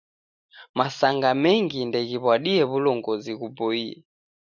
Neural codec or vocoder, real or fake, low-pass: none; real; 7.2 kHz